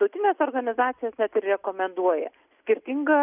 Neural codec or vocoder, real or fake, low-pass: none; real; 3.6 kHz